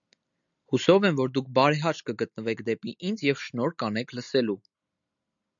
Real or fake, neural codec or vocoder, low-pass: real; none; 7.2 kHz